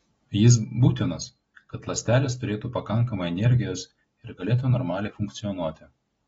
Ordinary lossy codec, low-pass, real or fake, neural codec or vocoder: AAC, 24 kbps; 19.8 kHz; real; none